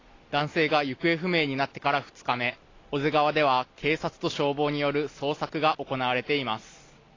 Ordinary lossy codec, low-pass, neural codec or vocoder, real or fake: AAC, 32 kbps; 7.2 kHz; none; real